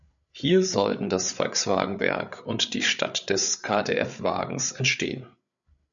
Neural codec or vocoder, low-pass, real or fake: codec, 16 kHz, 8 kbps, FreqCodec, larger model; 7.2 kHz; fake